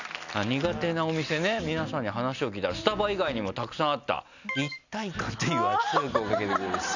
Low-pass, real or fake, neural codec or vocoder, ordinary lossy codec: 7.2 kHz; real; none; none